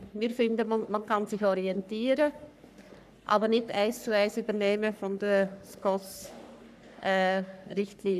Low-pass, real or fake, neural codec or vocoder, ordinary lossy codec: 14.4 kHz; fake; codec, 44.1 kHz, 3.4 kbps, Pupu-Codec; none